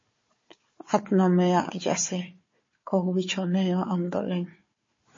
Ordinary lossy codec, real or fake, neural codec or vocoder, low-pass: MP3, 32 kbps; fake; codec, 16 kHz, 4 kbps, FunCodec, trained on Chinese and English, 50 frames a second; 7.2 kHz